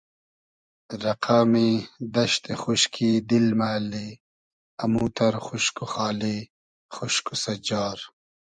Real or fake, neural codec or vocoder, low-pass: fake; vocoder, 24 kHz, 100 mel bands, Vocos; 9.9 kHz